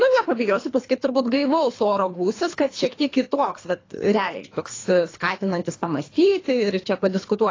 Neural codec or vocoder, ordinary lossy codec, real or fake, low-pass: codec, 24 kHz, 3 kbps, HILCodec; AAC, 32 kbps; fake; 7.2 kHz